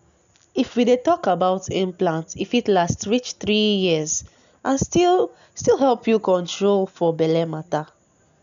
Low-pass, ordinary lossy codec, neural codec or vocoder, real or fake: 7.2 kHz; none; none; real